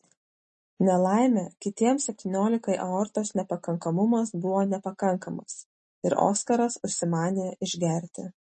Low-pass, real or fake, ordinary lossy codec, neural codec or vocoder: 9.9 kHz; real; MP3, 32 kbps; none